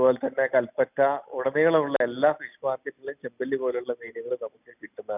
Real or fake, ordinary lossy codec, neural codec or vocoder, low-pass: real; none; none; 3.6 kHz